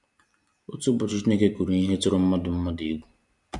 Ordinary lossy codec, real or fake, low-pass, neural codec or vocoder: none; fake; 10.8 kHz; vocoder, 44.1 kHz, 128 mel bands every 256 samples, BigVGAN v2